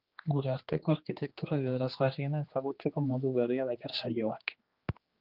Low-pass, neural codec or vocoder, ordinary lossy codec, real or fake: 5.4 kHz; codec, 16 kHz, 2 kbps, X-Codec, HuBERT features, trained on general audio; Opus, 32 kbps; fake